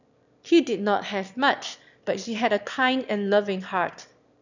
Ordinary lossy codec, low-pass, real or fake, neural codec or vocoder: none; 7.2 kHz; fake; autoencoder, 22.05 kHz, a latent of 192 numbers a frame, VITS, trained on one speaker